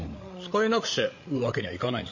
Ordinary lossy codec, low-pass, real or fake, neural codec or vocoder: MP3, 32 kbps; 7.2 kHz; fake; codec, 16 kHz, 8 kbps, FreqCodec, larger model